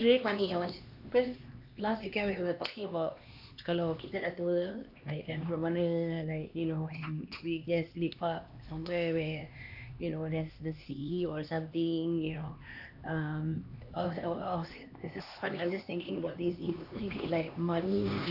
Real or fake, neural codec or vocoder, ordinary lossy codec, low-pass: fake; codec, 16 kHz, 2 kbps, X-Codec, HuBERT features, trained on LibriSpeech; AAC, 48 kbps; 5.4 kHz